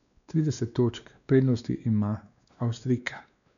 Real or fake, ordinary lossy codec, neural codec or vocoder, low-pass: fake; none; codec, 16 kHz, 2 kbps, X-Codec, WavLM features, trained on Multilingual LibriSpeech; 7.2 kHz